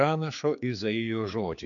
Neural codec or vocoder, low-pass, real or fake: codec, 16 kHz, 4 kbps, X-Codec, HuBERT features, trained on general audio; 7.2 kHz; fake